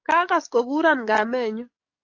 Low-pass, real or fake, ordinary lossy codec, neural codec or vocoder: 7.2 kHz; fake; AAC, 48 kbps; codec, 44.1 kHz, 7.8 kbps, DAC